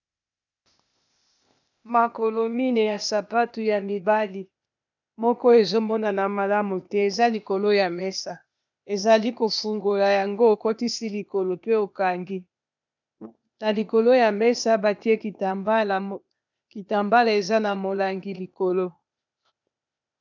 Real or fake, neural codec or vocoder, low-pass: fake; codec, 16 kHz, 0.8 kbps, ZipCodec; 7.2 kHz